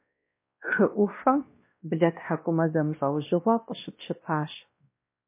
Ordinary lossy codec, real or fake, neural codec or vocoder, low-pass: MP3, 24 kbps; fake; codec, 16 kHz, 1 kbps, X-Codec, WavLM features, trained on Multilingual LibriSpeech; 3.6 kHz